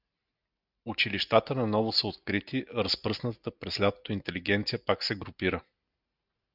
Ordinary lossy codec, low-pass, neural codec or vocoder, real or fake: Opus, 64 kbps; 5.4 kHz; none; real